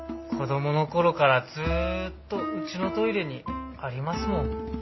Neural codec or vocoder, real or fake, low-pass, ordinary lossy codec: none; real; 7.2 kHz; MP3, 24 kbps